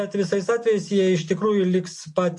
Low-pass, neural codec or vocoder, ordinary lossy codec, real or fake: 10.8 kHz; none; MP3, 48 kbps; real